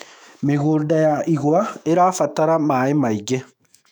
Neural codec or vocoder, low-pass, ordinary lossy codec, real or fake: autoencoder, 48 kHz, 128 numbers a frame, DAC-VAE, trained on Japanese speech; 19.8 kHz; none; fake